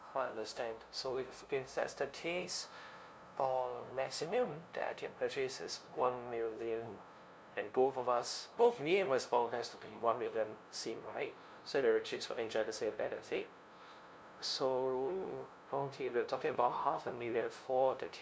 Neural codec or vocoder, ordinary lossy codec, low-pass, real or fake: codec, 16 kHz, 0.5 kbps, FunCodec, trained on LibriTTS, 25 frames a second; none; none; fake